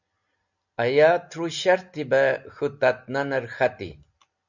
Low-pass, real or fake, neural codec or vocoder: 7.2 kHz; real; none